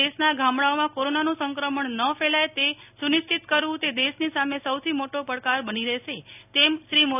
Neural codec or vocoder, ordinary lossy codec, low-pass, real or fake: none; none; 3.6 kHz; real